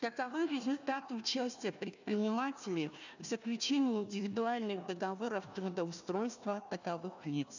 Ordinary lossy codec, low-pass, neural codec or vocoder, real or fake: none; 7.2 kHz; codec, 16 kHz, 1 kbps, FunCodec, trained on Chinese and English, 50 frames a second; fake